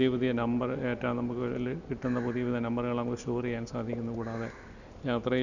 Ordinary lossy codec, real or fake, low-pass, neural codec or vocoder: none; real; 7.2 kHz; none